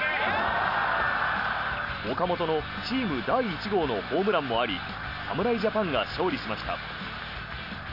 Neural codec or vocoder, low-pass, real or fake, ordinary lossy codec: none; 5.4 kHz; real; none